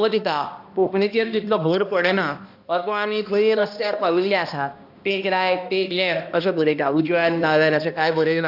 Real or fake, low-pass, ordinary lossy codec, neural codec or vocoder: fake; 5.4 kHz; none; codec, 16 kHz, 1 kbps, X-Codec, HuBERT features, trained on balanced general audio